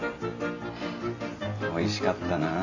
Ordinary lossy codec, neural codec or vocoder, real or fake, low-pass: none; none; real; 7.2 kHz